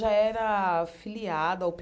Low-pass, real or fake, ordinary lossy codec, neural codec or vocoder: none; real; none; none